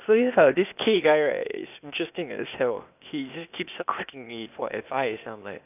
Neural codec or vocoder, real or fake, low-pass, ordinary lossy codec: codec, 16 kHz, 0.8 kbps, ZipCodec; fake; 3.6 kHz; none